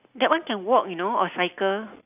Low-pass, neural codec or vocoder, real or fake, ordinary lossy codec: 3.6 kHz; none; real; none